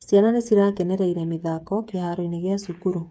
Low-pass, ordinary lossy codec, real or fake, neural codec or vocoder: none; none; fake; codec, 16 kHz, 8 kbps, FreqCodec, smaller model